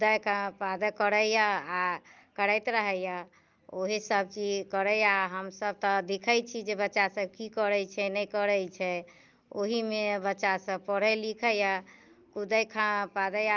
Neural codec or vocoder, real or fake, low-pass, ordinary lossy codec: none; real; 7.2 kHz; Opus, 32 kbps